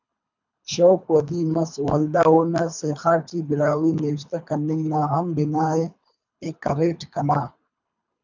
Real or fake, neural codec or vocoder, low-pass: fake; codec, 24 kHz, 3 kbps, HILCodec; 7.2 kHz